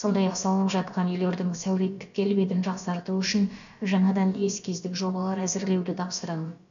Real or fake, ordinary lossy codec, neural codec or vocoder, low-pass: fake; none; codec, 16 kHz, about 1 kbps, DyCAST, with the encoder's durations; 7.2 kHz